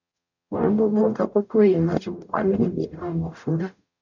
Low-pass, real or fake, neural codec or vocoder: 7.2 kHz; fake; codec, 44.1 kHz, 0.9 kbps, DAC